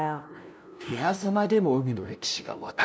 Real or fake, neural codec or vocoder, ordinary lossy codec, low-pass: fake; codec, 16 kHz, 0.5 kbps, FunCodec, trained on LibriTTS, 25 frames a second; none; none